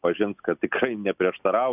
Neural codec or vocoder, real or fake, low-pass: none; real; 3.6 kHz